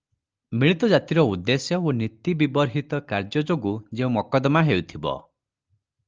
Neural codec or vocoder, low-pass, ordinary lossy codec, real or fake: none; 7.2 kHz; Opus, 24 kbps; real